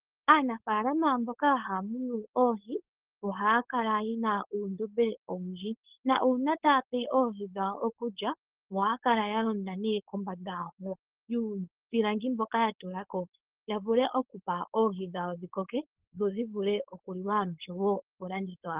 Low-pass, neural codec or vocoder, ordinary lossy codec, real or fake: 3.6 kHz; codec, 16 kHz, 4.8 kbps, FACodec; Opus, 16 kbps; fake